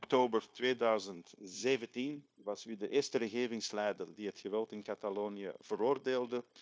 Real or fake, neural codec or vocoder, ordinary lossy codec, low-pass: fake; codec, 16 kHz, 0.9 kbps, LongCat-Audio-Codec; none; none